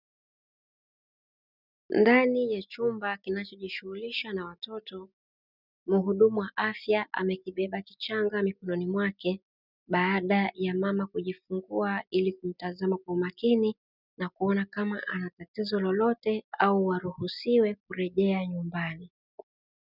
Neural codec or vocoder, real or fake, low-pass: none; real; 5.4 kHz